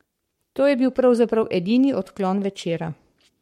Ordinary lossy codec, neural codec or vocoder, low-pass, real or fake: MP3, 64 kbps; codec, 44.1 kHz, 7.8 kbps, Pupu-Codec; 19.8 kHz; fake